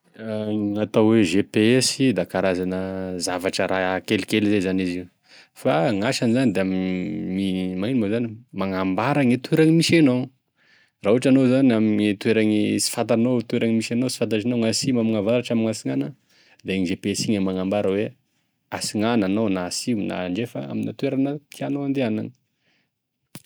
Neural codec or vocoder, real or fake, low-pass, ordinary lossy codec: none; real; none; none